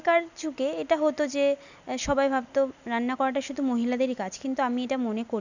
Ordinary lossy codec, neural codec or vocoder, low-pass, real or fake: none; none; 7.2 kHz; real